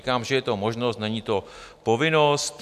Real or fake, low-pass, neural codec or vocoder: real; 14.4 kHz; none